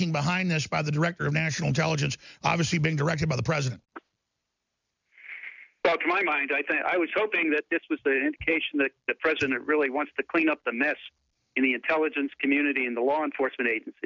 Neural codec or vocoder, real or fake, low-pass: none; real; 7.2 kHz